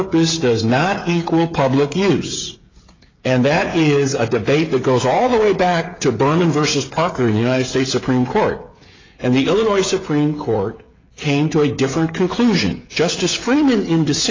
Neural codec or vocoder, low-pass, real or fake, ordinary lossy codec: codec, 16 kHz, 16 kbps, FreqCodec, smaller model; 7.2 kHz; fake; AAC, 32 kbps